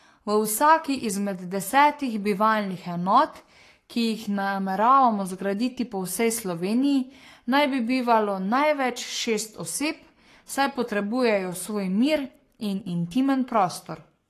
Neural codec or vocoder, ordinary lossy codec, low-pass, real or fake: codec, 44.1 kHz, 7.8 kbps, Pupu-Codec; AAC, 48 kbps; 14.4 kHz; fake